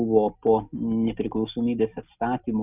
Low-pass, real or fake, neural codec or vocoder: 3.6 kHz; real; none